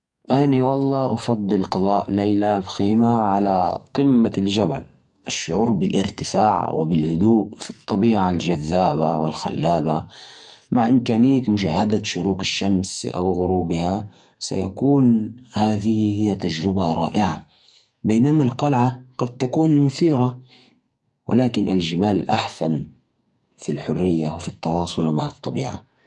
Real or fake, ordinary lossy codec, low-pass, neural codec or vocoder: fake; MP3, 64 kbps; 10.8 kHz; codec, 44.1 kHz, 2.6 kbps, SNAC